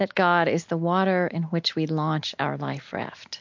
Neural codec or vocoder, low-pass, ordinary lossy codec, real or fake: none; 7.2 kHz; MP3, 48 kbps; real